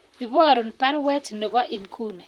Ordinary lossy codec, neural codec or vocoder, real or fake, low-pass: Opus, 24 kbps; vocoder, 44.1 kHz, 128 mel bands, Pupu-Vocoder; fake; 19.8 kHz